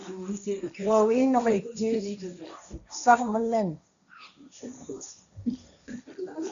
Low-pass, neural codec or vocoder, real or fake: 7.2 kHz; codec, 16 kHz, 1.1 kbps, Voila-Tokenizer; fake